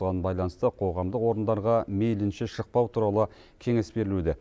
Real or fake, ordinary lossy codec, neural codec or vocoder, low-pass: real; none; none; none